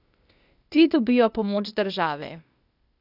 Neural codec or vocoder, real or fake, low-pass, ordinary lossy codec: codec, 16 kHz, 0.8 kbps, ZipCodec; fake; 5.4 kHz; none